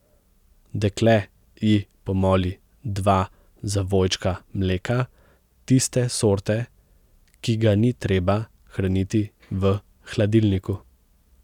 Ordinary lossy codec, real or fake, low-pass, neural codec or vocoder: none; real; 19.8 kHz; none